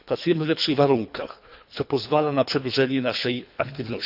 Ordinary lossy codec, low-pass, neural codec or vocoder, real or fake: none; 5.4 kHz; codec, 24 kHz, 3 kbps, HILCodec; fake